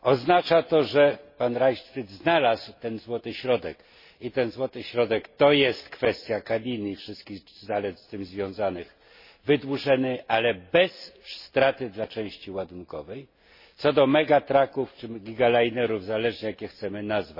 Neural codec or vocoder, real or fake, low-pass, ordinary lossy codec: none; real; 5.4 kHz; MP3, 24 kbps